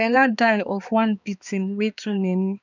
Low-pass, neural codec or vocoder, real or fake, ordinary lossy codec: 7.2 kHz; codec, 16 kHz, 2 kbps, X-Codec, HuBERT features, trained on balanced general audio; fake; none